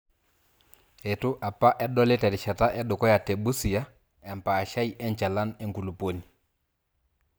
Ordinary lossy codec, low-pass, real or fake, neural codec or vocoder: none; none; real; none